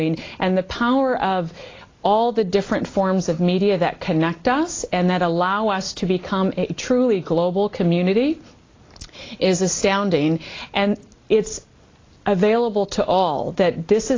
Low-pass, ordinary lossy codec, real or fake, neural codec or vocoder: 7.2 kHz; AAC, 32 kbps; real; none